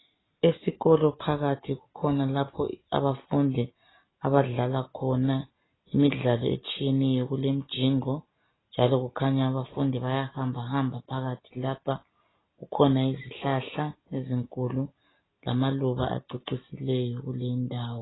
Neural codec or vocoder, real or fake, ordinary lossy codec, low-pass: none; real; AAC, 16 kbps; 7.2 kHz